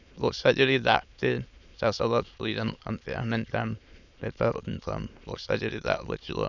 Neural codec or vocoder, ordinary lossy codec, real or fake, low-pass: autoencoder, 22.05 kHz, a latent of 192 numbers a frame, VITS, trained on many speakers; none; fake; 7.2 kHz